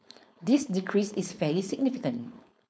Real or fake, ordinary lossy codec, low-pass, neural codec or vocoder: fake; none; none; codec, 16 kHz, 4.8 kbps, FACodec